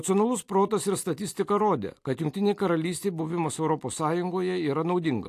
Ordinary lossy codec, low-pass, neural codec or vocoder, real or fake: AAC, 64 kbps; 14.4 kHz; none; real